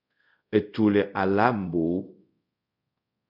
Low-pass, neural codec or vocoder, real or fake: 5.4 kHz; codec, 24 kHz, 0.5 kbps, DualCodec; fake